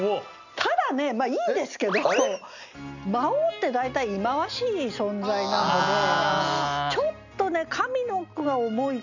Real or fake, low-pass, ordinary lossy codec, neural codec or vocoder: real; 7.2 kHz; none; none